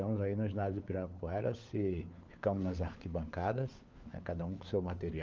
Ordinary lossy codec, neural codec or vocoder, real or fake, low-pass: Opus, 24 kbps; codec, 16 kHz, 4 kbps, FunCodec, trained on LibriTTS, 50 frames a second; fake; 7.2 kHz